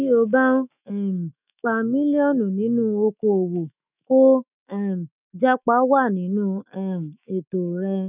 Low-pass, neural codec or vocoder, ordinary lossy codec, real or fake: 3.6 kHz; autoencoder, 48 kHz, 128 numbers a frame, DAC-VAE, trained on Japanese speech; none; fake